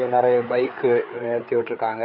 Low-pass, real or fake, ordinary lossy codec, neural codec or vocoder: 5.4 kHz; fake; none; codec, 16 kHz, 4 kbps, FreqCodec, larger model